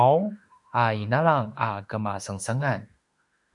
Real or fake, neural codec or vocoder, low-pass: fake; autoencoder, 48 kHz, 32 numbers a frame, DAC-VAE, trained on Japanese speech; 10.8 kHz